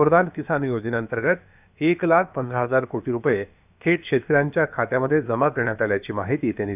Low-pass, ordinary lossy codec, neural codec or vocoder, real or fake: 3.6 kHz; none; codec, 16 kHz, about 1 kbps, DyCAST, with the encoder's durations; fake